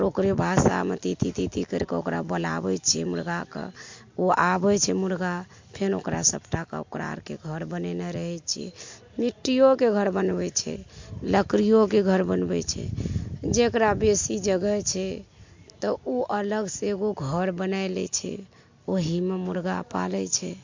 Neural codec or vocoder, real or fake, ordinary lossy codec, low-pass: none; real; MP3, 48 kbps; 7.2 kHz